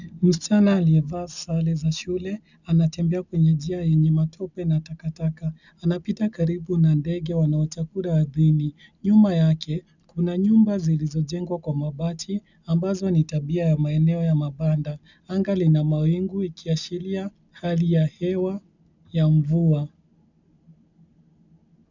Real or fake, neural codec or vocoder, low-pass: real; none; 7.2 kHz